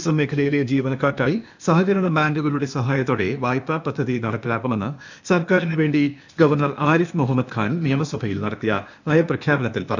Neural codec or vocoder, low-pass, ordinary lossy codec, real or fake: codec, 16 kHz, 0.8 kbps, ZipCodec; 7.2 kHz; none; fake